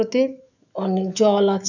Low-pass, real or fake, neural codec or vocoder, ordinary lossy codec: 7.2 kHz; fake; autoencoder, 48 kHz, 128 numbers a frame, DAC-VAE, trained on Japanese speech; none